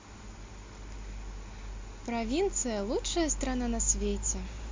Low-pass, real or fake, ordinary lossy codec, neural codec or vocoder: 7.2 kHz; real; MP3, 48 kbps; none